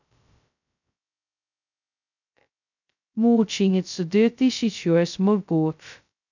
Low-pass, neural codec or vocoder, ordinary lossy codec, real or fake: 7.2 kHz; codec, 16 kHz, 0.2 kbps, FocalCodec; none; fake